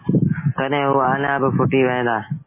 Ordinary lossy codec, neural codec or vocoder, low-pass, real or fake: MP3, 16 kbps; none; 3.6 kHz; real